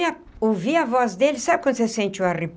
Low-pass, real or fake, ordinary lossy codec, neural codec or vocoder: none; real; none; none